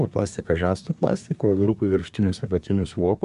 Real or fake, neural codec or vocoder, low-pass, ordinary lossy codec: fake; codec, 24 kHz, 1 kbps, SNAC; 10.8 kHz; MP3, 96 kbps